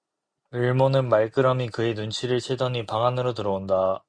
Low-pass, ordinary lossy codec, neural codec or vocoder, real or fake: 9.9 kHz; Opus, 64 kbps; none; real